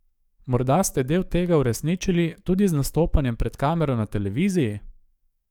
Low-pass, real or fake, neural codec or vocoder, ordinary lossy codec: 19.8 kHz; fake; codec, 44.1 kHz, 7.8 kbps, DAC; none